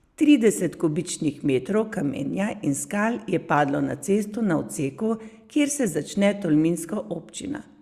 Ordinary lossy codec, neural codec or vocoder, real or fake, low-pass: Opus, 64 kbps; none; real; 14.4 kHz